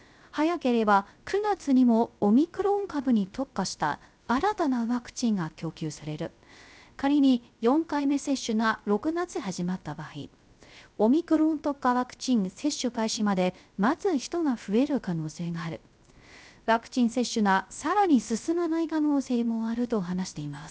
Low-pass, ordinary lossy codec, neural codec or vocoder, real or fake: none; none; codec, 16 kHz, 0.3 kbps, FocalCodec; fake